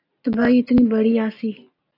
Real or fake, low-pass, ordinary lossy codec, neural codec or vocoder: real; 5.4 kHz; AAC, 32 kbps; none